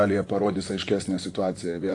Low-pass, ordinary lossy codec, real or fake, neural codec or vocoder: 10.8 kHz; AAC, 48 kbps; fake; vocoder, 44.1 kHz, 128 mel bands, Pupu-Vocoder